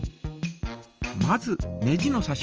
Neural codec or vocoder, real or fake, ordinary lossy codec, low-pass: none; real; Opus, 24 kbps; 7.2 kHz